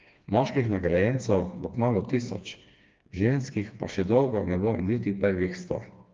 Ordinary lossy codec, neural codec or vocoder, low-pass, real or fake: Opus, 24 kbps; codec, 16 kHz, 2 kbps, FreqCodec, smaller model; 7.2 kHz; fake